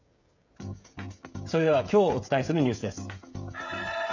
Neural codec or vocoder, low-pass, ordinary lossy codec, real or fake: codec, 16 kHz, 16 kbps, FreqCodec, smaller model; 7.2 kHz; none; fake